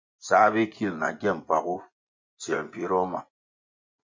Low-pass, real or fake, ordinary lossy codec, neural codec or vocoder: 7.2 kHz; fake; MP3, 48 kbps; vocoder, 24 kHz, 100 mel bands, Vocos